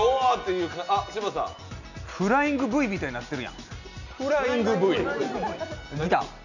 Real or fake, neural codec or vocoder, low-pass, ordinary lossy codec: real; none; 7.2 kHz; none